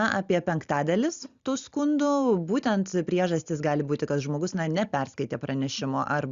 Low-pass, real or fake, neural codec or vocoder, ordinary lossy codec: 7.2 kHz; real; none; Opus, 64 kbps